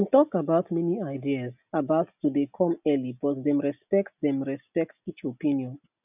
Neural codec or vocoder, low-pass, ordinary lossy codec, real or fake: none; 3.6 kHz; none; real